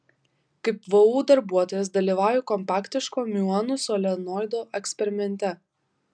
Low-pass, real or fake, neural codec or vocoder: 9.9 kHz; real; none